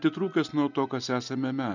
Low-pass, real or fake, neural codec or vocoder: 7.2 kHz; real; none